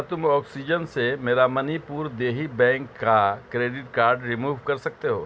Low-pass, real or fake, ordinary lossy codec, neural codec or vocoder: none; real; none; none